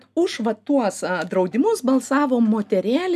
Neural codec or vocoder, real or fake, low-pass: none; real; 14.4 kHz